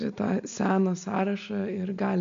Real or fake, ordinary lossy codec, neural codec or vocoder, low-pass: real; MP3, 64 kbps; none; 7.2 kHz